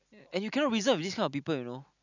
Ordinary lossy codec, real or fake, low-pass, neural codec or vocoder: none; real; 7.2 kHz; none